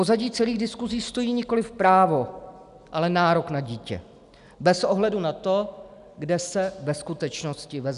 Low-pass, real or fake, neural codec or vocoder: 10.8 kHz; real; none